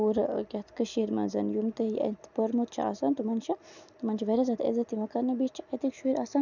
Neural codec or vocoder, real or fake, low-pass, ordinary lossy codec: none; real; 7.2 kHz; none